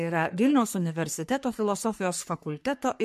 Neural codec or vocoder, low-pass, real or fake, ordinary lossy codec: codec, 44.1 kHz, 3.4 kbps, Pupu-Codec; 14.4 kHz; fake; MP3, 64 kbps